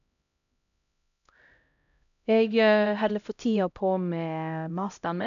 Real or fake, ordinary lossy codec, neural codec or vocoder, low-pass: fake; none; codec, 16 kHz, 0.5 kbps, X-Codec, HuBERT features, trained on LibriSpeech; 7.2 kHz